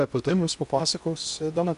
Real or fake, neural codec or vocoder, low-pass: fake; codec, 16 kHz in and 24 kHz out, 0.8 kbps, FocalCodec, streaming, 65536 codes; 10.8 kHz